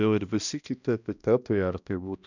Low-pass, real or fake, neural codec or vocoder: 7.2 kHz; fake; codec, 16 kHz, 1 kbps, X-Codec, HuBERT features, trained on balanced general audio